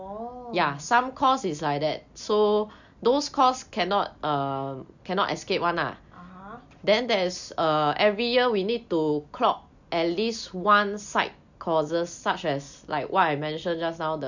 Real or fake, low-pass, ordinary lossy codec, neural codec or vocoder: real; 7.2 kHz; none; none